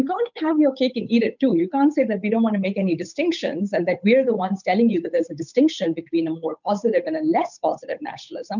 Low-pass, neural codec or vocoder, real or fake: 7.2 kHz; codec, 16 kHz, 8 kbps, FunCodec, trained on Chinese and English, 25 frames a second; fake